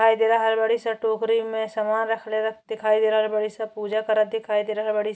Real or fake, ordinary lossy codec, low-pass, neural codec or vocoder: real; none; none; none